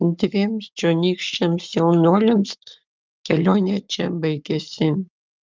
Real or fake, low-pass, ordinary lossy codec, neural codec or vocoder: fake; 7.2 kHz; Opus, 24 kbps; codec, 44.1 kHz, 7.8 kbps, Pupu-Codec